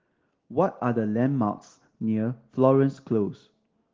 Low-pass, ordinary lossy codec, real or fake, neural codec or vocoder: 7.2 kHz; Opus, 16 kbps; real; none